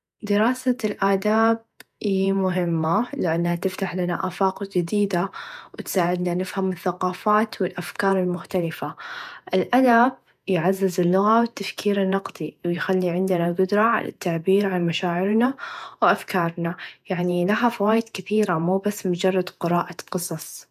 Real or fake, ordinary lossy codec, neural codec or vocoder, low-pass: fake; none; vocoder, 48 kHz, 128 mel bands, Vocos; 14.4 kHz